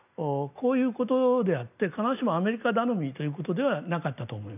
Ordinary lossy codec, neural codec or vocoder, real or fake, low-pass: none; none; real; 3.6 kHz